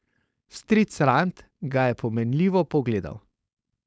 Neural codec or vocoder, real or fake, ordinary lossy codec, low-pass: codec, 16 kHz, 4.8 kbps, FACodec; fake; none; none